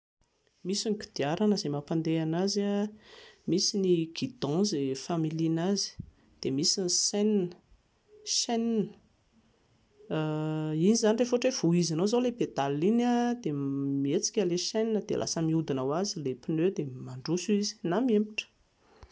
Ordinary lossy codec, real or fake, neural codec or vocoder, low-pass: none; real; none; none